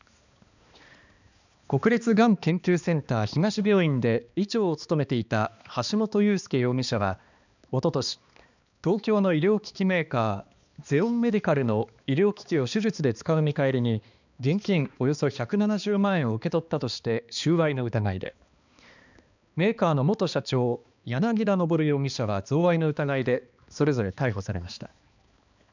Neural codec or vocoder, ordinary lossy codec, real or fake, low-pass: codec, 16 kHz, 2 kbps, X-Codec, HuBERT features, trained on balanced general audio; none; fake; 7.2 kHz